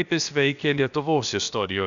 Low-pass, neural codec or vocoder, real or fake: 7.2 kHz; codec, 16 kHz, about 1 kbps, DyCAST, with the encoder's durations; fake